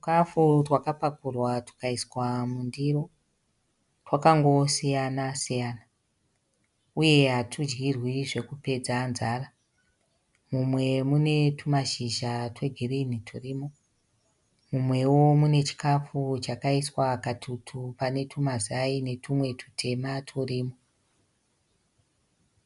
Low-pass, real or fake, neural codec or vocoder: 10.8 kHz; real; none